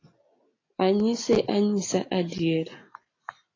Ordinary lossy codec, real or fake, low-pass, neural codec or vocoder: AAC, 32 kbps; real; 7.2 kHz; none